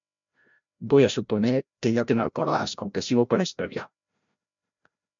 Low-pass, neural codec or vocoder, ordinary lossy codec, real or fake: 7.2 kHz; codec, 16 kHz, 0.5 kbps, FreqCodec, larger model; MP3, 64 kbps; fake